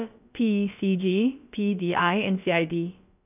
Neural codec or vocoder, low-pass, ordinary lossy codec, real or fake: codec, 16 kHz, about 1 kbps, DyCAST, with the encoder's durations; 3.6 kHz; none; fake